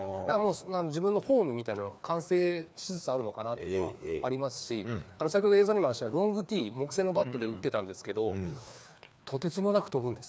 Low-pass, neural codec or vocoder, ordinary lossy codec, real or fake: none; codec, 16 kHz, 2 kbps, FreqCodec, larger model; none; fake